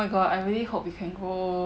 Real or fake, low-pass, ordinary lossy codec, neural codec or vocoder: real; none; none; none